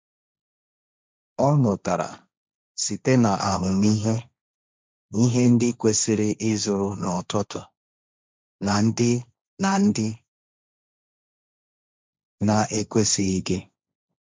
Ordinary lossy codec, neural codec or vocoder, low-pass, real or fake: none; codec, 16 kHz, 1.1 kbps, Voila-Tokenizer; none; fake